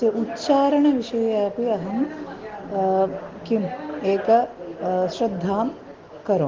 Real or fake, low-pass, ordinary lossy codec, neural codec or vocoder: real; 7.2 kHz; Opus, 16 kbps; none